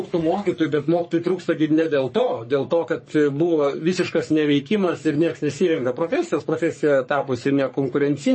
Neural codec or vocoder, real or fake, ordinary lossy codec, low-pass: codec, 44.1 kHz, 3.4 kbps, Pupu-Codec; fake; MP3, 32 kbps; 9.9 kHz